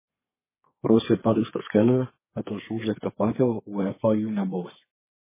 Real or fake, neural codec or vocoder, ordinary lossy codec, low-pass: fake; codec, 32 kHz, 1.9 kbps, SNAC; MP3, 16 kbps; 3.6 kHz